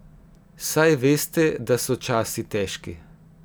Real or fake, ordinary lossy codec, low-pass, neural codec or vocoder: real; none; none; none